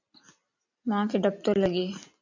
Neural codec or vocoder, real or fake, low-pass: none; real; 7.2 kHz